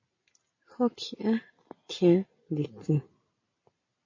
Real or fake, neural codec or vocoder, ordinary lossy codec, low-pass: real; none; MP3, 32 kbps; 7.2 kHz